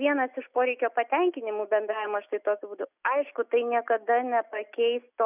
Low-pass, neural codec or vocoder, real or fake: 3.6 kHz; none; real